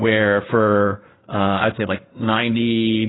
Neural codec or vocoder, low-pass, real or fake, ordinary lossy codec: codec, 16 kHz in and 24 kHz out, 2.2 kbps, FireRedTTS-2 codec; 7.2 kHz; fake; AAC, 16 kbps